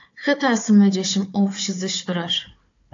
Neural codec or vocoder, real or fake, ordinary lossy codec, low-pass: codec, 16 kHz, 4 kbps, FunCodec, trained on Chinese and English, 50 frames a second; fake; AAC, 48 kbps; 7.2 kHz